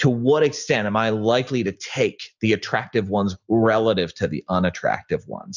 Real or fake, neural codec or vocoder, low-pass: real; none; 7.2 kHz